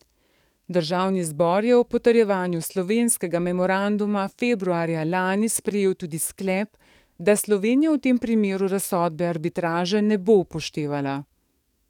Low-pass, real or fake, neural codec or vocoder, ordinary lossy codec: 19.8 kHz; fake; codec, 44.1 kHz, 7.8 kbps, DAC; none